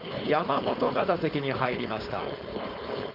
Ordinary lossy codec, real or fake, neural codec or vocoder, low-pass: Opus, 64 kbps; fake; codec, 16 kHz, 4.8 kbps, FACodec; 5.4 kHz